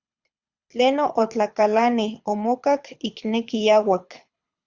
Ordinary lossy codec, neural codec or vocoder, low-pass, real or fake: Opus, 64 kbps; codec, 24 kHz, 6 kbps, HILCodec; 7.2 kHz; fake